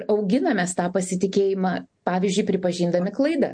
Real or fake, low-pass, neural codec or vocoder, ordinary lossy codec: real; 9.9 kHz; none; MP3, 48 kbps